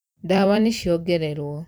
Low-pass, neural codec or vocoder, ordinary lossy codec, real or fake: none; vocoder, 44.1 kHz, 128 mel bands every 256 samples, BigVGAN v2; none; fake